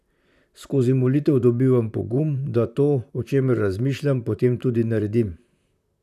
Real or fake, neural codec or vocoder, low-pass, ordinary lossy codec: fake; vocoder, 44.1 kHz, 128 mel bands, Pupu-Vocoder; 14.4 kHz; none